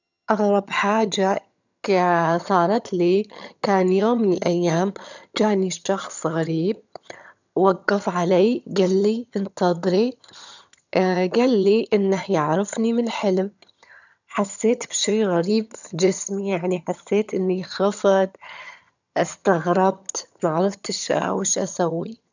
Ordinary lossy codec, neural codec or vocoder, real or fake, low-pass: none; vocoder, 22.05 kHz, 80 mel bands, HiFi-GAN; fake; 7.2 kHz